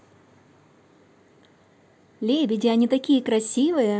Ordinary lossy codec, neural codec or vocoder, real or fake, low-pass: none; none; real; none